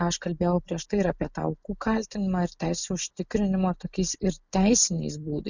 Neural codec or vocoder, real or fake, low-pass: none; real; 7.2 kHz